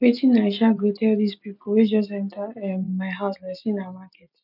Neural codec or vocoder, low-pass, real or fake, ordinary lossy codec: none; 5.4 kHz; real; none